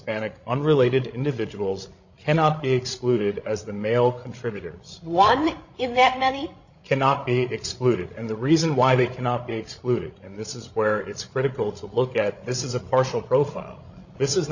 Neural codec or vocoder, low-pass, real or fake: codec, 16 kHz, 16 kbps, FreqCodec, larger model; 7.2 kHz; fake